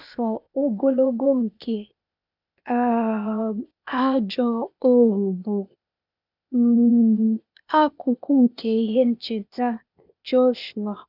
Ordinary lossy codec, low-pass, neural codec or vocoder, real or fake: none; 5.4 kHz; codec, 16 kHz, 0.8 kbps, ZipCodec; fake